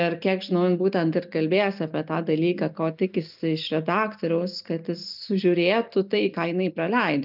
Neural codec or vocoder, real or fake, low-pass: none; real; 5.4 kHz